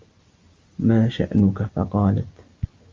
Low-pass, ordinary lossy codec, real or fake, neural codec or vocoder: 7.2 kHz; Opus, 32 kbps; real; none